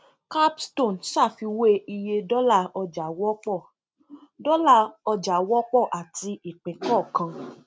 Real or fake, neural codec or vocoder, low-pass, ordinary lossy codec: real; none; none; none